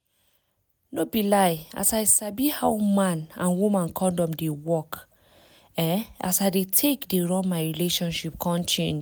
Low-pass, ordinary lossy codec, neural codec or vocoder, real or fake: none; none; none; real